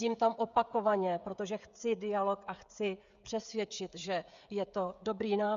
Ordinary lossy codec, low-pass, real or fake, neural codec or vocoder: Opus, 64 kbps; 7.2 kHz; fake; codec, 16 kHz, 16 kbps, FreqCodec, smaller model